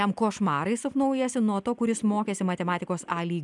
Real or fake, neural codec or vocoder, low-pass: real; none; 10.8 kHz